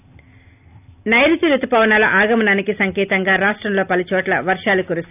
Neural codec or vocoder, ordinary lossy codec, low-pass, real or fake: none; none; 3.6 kHz; real